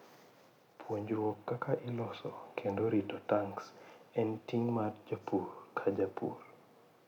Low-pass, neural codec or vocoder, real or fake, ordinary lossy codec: 19.8 kHz; none; real; none